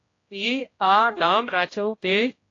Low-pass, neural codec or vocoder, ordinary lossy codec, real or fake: 7.2 kHz; codec, 16 kHz, 0.5 kbps, X-Codec, HuBERT features, trained on general audio; AAC, 32 kbps; fake